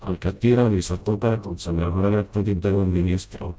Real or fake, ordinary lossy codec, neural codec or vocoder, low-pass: fake; none; codec, 16 kHz, 0.5 kbps, FreqCodec, smaller model; none